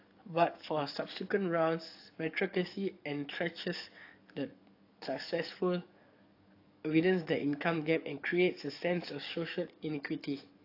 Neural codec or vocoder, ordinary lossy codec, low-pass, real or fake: codec, 44.1 kHz, 7.8 kbps, DAC; AAC, 32 kbps; 5.4 kHz; fake